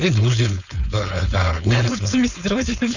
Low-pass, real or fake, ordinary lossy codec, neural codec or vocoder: 7.2 kHz; fake; none; codec, 16 kHz, 4.8 kbps, FACodec